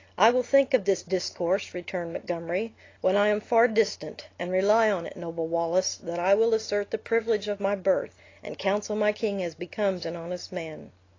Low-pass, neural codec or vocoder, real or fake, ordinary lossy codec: 7.2 kHz; none; real; AAC, 32 kbps